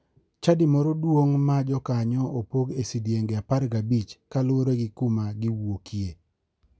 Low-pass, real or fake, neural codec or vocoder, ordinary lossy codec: none; real; none; none